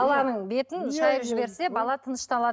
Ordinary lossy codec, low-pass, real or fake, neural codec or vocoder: none; none; real; none